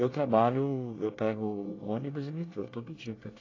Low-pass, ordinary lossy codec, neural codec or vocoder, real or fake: 7.2 kHz; AAC, 32 kbps; codec, 24 kHz, 1 kbps, SNAC; fake